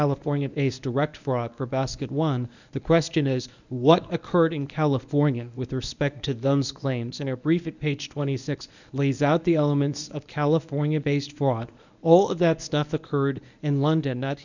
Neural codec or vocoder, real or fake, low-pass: codec, 24 kHz, 0.9 kbps, WavTokenizer, medium speech release version 1; fake; 7.2 kHz